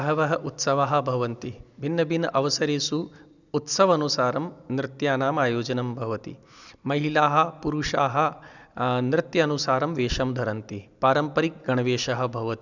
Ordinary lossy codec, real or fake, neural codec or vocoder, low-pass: none; real; none; 7.2 kHz